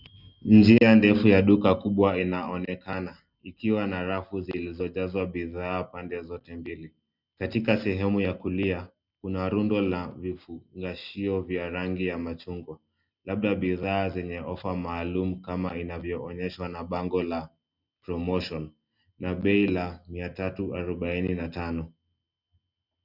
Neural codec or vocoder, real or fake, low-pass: none; real; 5.4 kHz